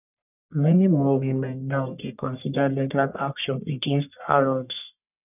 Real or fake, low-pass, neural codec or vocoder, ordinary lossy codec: fake; 3.6 kHz; codec, 44.1 kHz, 1.7 kbps, Pupu-Codec; none